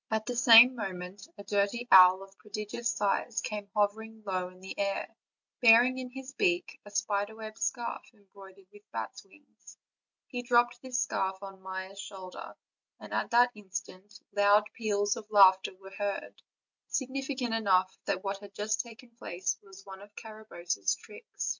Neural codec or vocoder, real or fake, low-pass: none; real; 7.2 kHz